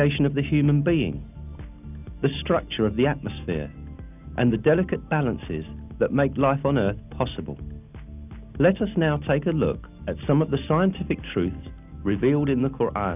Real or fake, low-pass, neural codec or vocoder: real; 3.6 kHz; none